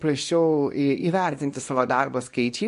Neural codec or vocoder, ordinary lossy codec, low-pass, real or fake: codec, 24 kHz, 0.9 kbps, WavTokenizer, small release; MP3, 48 kbps; 10.8 kHz; fake